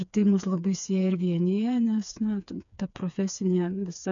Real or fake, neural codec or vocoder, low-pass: fake; codec, 16 kHz, 4 kbps, FreqCodec, smaller model; 7.2 kHz